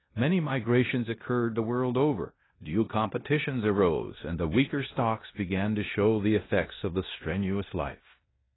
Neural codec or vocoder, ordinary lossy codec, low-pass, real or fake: codec, 16 kHz in and 24 kHz out, 0.9 kbps, LongCat-Audio-Codec, four codebook decoder; AAC, 16 kbps; 7.2 kHz; fake